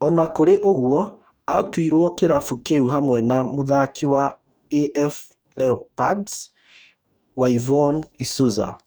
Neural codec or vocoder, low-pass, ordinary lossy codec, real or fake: codec, 44.1 kHz, 2.6 kbps, DAC; none; none; fake